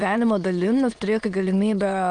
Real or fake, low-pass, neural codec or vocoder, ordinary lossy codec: fake; 9.9 kHz; autoencoder, 22.05 kHz, a latent of 192 numbers a frame, VITS, trained on many speakers; Opus, 32 kbps